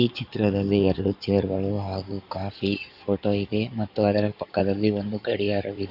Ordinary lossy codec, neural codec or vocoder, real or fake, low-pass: none; codec, 16 kHz in and 24 kHz out, 2.2 kbps, FireRedTTS-2 codec; fake; 5.4 kHz